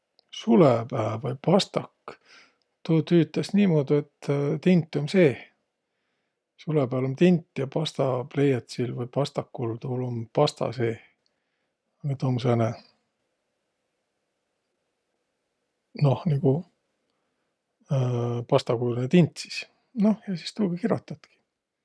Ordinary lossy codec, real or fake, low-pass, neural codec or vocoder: none; real; none; none